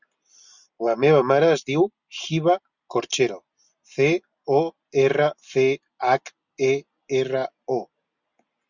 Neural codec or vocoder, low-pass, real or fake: none; 7.2 kHz; real